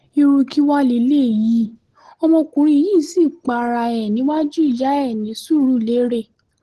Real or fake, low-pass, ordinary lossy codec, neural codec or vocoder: real; 10.8 kHz; Opus, 16 kbps; none